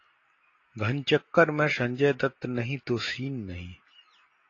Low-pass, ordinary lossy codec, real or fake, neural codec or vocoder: 7.2 kHz; AAC, 32 kbps; real; none